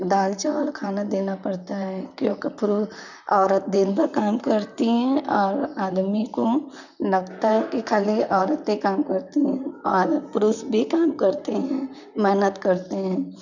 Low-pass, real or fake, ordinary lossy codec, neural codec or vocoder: 7.2 kHz; fake; none; vocoder, 44.1 kHz, 128 mel bands, Pupu-Vocoder